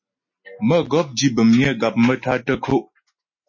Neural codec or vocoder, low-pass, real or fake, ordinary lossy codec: none; 7.2 kHz; real; MP3, 32 kbps